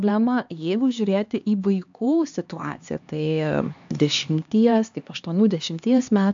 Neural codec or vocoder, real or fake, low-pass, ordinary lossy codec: codec, 16 kHz, 2 kbps, X-Codec, HuBERT features, trained on LibriSpeech; fake; 7.2 kHz; AAC, 64 kbps